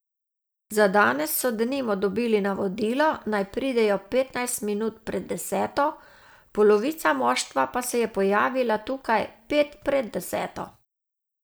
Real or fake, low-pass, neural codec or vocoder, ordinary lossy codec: real; none; none; none